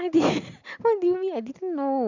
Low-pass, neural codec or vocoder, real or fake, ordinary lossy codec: 7.2 kHz; none; real; Opus, 64 kbps